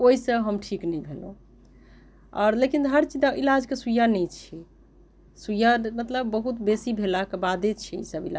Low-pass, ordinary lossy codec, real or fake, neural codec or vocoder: none; none; real; none